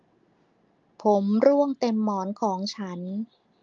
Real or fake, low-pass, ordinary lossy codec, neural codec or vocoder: real; 7.2 kHz; Opus, 24 kbps; none